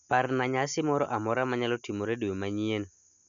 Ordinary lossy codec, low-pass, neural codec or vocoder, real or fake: none; 7.2 kHz; none; real